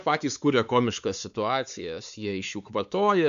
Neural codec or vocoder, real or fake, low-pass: codec, 16 kHz, 4 kbps, X-Codec, WavLM features, trained on Multilingual LibriSpeech; fake; 7.2 kHz